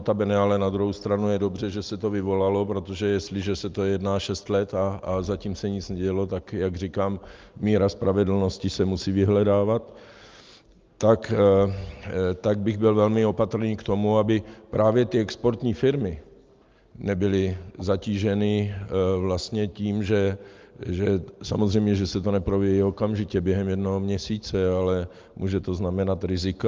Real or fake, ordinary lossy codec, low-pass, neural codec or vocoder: real; Opus, 24 kbps; 7.2 kHz; none